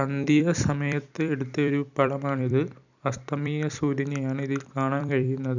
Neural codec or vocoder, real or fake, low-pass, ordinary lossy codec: vocoder, 44.1 kHz, 128 mel bands every 256 samples, BigVGAN v2; fake; 7.2 kHz; none